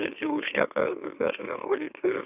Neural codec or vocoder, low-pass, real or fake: autoencoder, 44.1 kHz, a latent of 192 numbers a frame, MeloTTS; 3.6 kHz; fake